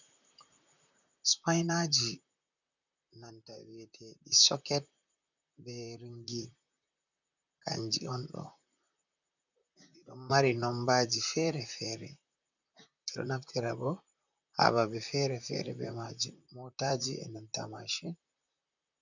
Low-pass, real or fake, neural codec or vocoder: 7.2 kHz; fake; vocoder, 44.1 kHz, 128 mel bands, Pupu-Vocoder